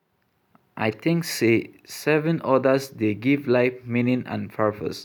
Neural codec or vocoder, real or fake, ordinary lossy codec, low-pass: none; real; none; none